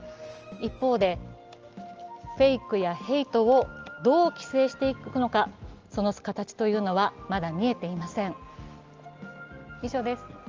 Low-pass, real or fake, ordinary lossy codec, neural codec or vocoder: 7.2 kHz; real; Opus, 24 kbps; none